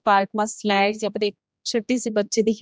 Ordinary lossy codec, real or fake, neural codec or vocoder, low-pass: none; fake; codec, 16 kHz, 1 kbps, X-Codec, HuBERT features, trained on general audio; none